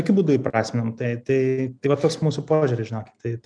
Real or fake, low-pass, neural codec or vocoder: real; 9.9 kHz; none